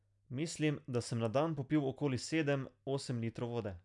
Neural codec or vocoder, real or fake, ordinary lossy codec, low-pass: none; real; none; 10.8 kHz